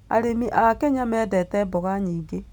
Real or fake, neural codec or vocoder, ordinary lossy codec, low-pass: real; none; none; 19.8 kHz